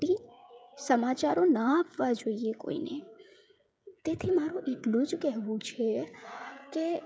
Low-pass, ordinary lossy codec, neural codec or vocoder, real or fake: none; none; codec, 16 kHz, 16 kbps, FreqCodec, smaller model; fake